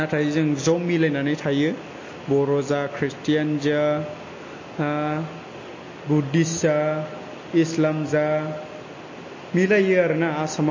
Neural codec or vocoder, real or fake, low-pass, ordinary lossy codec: none; real; 7.2 kHz; MP3, 32 kbps